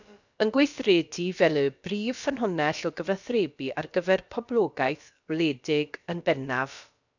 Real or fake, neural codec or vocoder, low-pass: fake; codec, 16 kHz, about 1 kbps, DyCAST, with the encoder's durations; 7.2 kHz